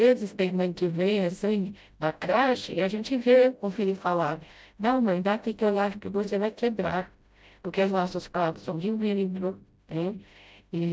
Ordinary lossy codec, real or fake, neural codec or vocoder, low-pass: none; fake; codec, 16 kHz, 0.5 kbps, FreqCodec, smaller model; none